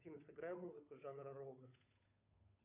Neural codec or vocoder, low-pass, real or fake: codec, 16 kHz, 8 kbps, FunCodec, trained on Chinese and English, 25 frames a second; 3.6 kHz; fake